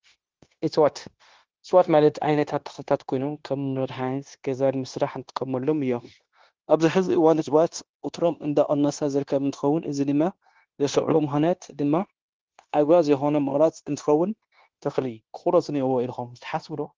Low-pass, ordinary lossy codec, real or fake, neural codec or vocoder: 7.2 kHz; Opus, 16 kbps; fake; codec, 16 kHz, 0.9 kbps, LongCat-Audio-Codec